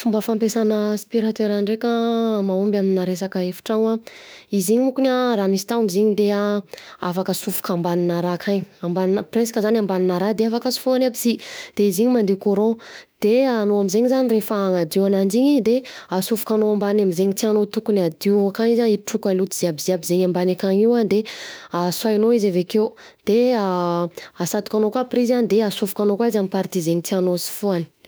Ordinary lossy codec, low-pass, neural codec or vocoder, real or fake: none; none; autoencoder, 48 kHz, 32 numbers a frame, DAC-VAE, trained on Japanese speech; fake